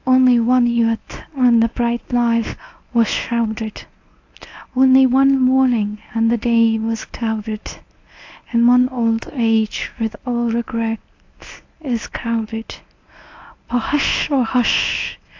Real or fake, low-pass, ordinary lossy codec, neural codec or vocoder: fake; 7.2 kHz; AAC, 48 kbps; codec, 24 kHz, 0.9 kbps, WavTokenizer, medium speech release version 1